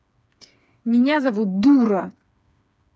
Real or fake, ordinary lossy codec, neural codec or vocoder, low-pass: fake; none; codec, 16 kHz, 4 kbps, FreqCodec, smaller model; none